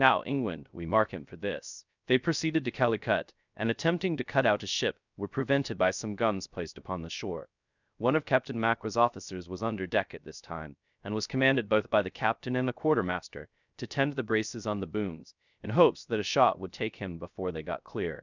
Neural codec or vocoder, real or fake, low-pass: codec, 16 kHz, 0.3 kbps, FocalCodec; fake; 7.2 kHz